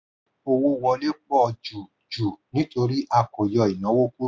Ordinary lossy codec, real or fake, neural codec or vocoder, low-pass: none; real; none; none